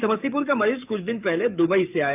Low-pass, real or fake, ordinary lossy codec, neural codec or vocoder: 3.6 kHz; fake; none; codec, 44.1 kHz, 7.8 kbps, DAC